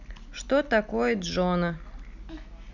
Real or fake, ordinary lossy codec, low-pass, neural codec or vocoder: real; none; 7.2 kHz; none